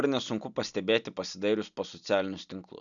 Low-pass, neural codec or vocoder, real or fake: 7.2 kHz; none; real